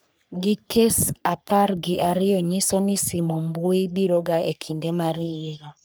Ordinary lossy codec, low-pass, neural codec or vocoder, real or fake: none; none; codec, 44.1 kHz, 3.4 kbps, Pupu-Codec; fake